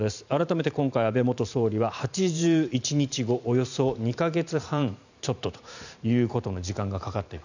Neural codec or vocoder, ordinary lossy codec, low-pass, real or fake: vocoder, 44.1 kHz, 128 mel bands every 512 samples, BigVGAN v2; none; 7.2 kHz; fake